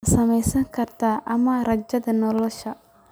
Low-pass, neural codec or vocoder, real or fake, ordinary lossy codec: none; none; real; none